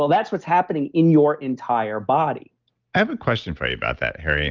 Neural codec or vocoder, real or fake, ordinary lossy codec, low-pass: none; real; Opus, 32 kbps; 7.2 kHz